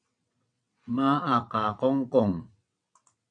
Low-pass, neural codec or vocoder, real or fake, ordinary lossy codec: 9.9 kHz; vocoder, 22.05 kHz, 80 mel bands, WaveNeXt; fake; AAC, 64 kbps